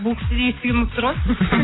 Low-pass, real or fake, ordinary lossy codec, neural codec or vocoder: 7.2 kHz; real; AAC, 16 kbps; none